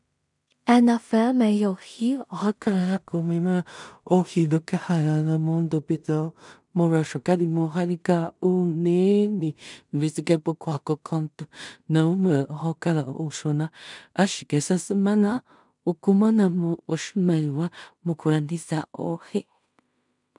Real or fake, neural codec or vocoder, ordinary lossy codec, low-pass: fake; codec, 16 kHz in and 24 kHz out, 0.4 kbps, LongCat-Audio-Codec, two codebook decoder; MP3, 96 kbps; 10.8 kHz